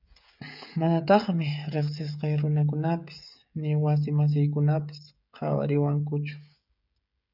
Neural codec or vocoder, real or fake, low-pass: codec, 16 kHz, 16 kbps, FreqCodec, smaller model; fake; 5.4 kHz